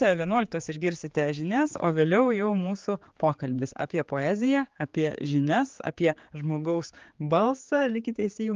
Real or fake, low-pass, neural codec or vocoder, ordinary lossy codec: fake; 7.2 kHz; codec, 16 kHz, 4 kbps, X-Codec, HuBERT features, trained on general audio; Opus, 32 kbps